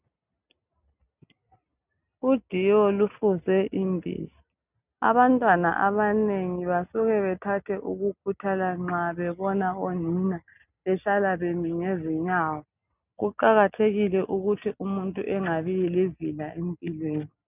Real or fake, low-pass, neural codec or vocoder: real; 3.6 kHz; none